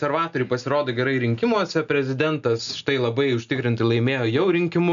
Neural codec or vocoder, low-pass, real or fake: none; 7.2 kHz; real